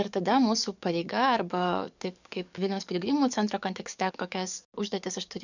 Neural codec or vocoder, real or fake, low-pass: codec, 44.1 kHz, 7.8 kbps, DAC; fake; 7.2 kHz